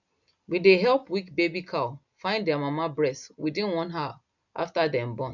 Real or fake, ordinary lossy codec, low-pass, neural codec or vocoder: real; none; 7.2 kHz; none